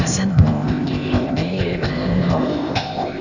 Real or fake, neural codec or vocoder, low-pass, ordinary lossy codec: fake; codec, 16 kHz, 0.8 kbps, ZipCodec; 7.2 kHz; none